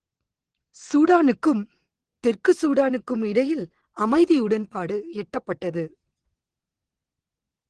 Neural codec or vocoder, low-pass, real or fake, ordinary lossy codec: vocoder, 22.05 kHz, 80 mel bands, WaveNeXt; 9.9 kHz; fake; Opus, 16 kbps